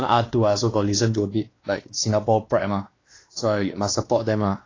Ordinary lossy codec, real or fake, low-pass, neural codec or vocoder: AAC, 32 kbps; fake; 7.2 kHz; codec, 16 kHz, 2 kbps, X-Codec, WavLM features, trained on Multilingual LibriSpeech